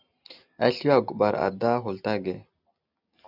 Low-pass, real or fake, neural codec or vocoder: 5.4 kHz; real; none